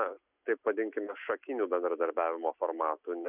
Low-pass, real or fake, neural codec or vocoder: 3.6 kHz; real; none